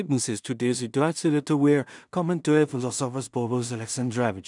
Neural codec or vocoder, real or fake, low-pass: codec, 16 kHz in and 24 kHz out, 0.4 kbps, LongCat-Audio-Codec, two codebook decoder; fake; 10.8 kHz